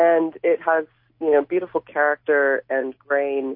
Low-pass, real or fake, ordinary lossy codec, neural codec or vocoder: 5.4 kHz; real; MP3, 32 kbps; none